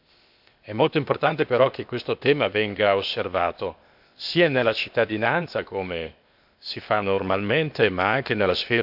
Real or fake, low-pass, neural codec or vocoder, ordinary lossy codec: fake; 5.4 kHz; codec, 16 kHz, 0.8 kbps, ZipCodec; none